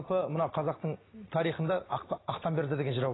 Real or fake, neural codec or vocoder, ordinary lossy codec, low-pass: real; none; AAC, 16 kbps; 7.2 kHz